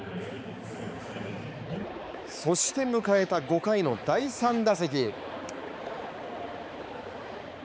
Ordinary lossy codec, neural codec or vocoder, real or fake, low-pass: none; codec, 16 kHz, 4 kbps, X-Codec, HuBERT features, trained on balanced general audio; fake; none